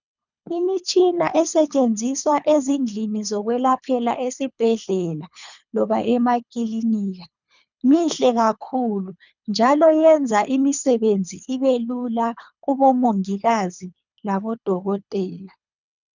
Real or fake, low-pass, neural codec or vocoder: fake; 7.2 kHz; codec, 24 kHz, 3 kbps, HILCodec